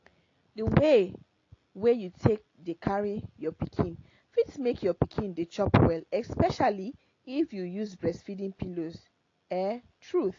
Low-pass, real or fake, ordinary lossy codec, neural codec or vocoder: 7.2 kHz; real; AAC, 32 kbps; none